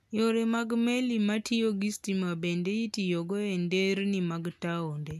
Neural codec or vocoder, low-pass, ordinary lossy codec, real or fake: none; 14.4 kHz; none; real